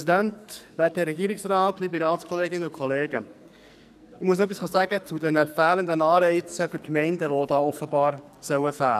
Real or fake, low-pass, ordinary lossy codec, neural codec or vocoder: fake; 14.4 kHz; none; codec, 44.1 kHz, 2.6 kbps, SNAC